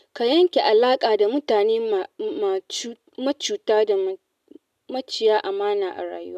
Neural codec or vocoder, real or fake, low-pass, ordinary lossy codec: none; real; 14.4 kHz; none